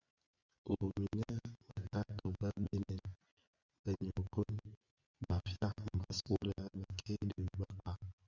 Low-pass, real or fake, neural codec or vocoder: 7.2 kHz; fake; vocoder, 24 kHz, 100 mel bands, Vocos